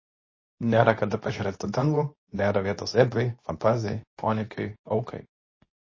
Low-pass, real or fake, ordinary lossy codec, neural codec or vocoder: 7.2 kHz; fake; MP3, 32 kbps; codec, 24 kHz, 0.9 kbps, WavTokenizer, medium speech release version 2